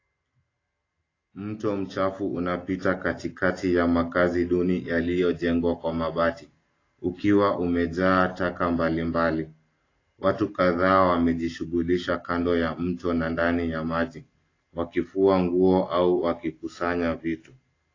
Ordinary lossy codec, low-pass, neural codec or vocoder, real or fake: AAC, 32 kbps; 7.2 kHz; none; real